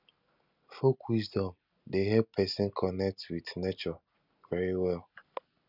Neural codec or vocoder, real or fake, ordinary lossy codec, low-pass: none; real; none; 5.4 kHz